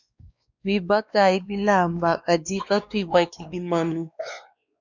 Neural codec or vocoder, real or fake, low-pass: codec, 16 kHz, 2 kbps, X-Codec, WavLM features, trained on Multilingual LibriSpeech; fake; 7.2 kHz